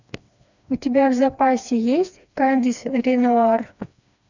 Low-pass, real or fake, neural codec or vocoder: 7.2 kHz; fake; codec, 16 kHz, 2 kbps, FreqCodec, smaller model